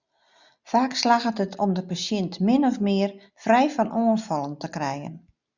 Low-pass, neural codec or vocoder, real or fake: 7.2 kHz; none; real